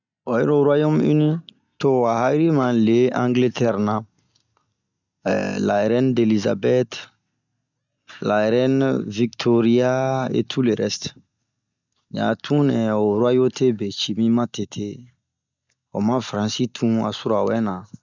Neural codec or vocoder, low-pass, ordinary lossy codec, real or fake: none; 7.2 kHz; none; real